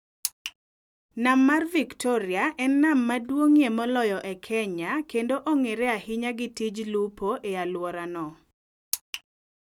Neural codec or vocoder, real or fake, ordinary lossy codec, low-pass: none; real; none; 19.8 kHz